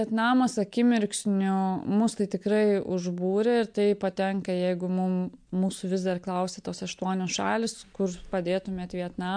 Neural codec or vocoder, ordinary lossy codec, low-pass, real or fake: none; MP3, 64 kbps; 9.9 kHz; real